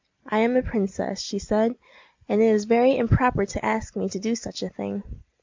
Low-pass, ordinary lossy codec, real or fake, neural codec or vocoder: 7.2 kHz; MP3, 64 kbps; real; none